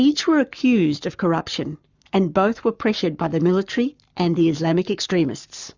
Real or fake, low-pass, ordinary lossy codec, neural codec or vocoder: fake; 7.2 kHz; Opus, 64 kbps; codec, 44.1 kHz, 7.8 kbps, Pupu-Codec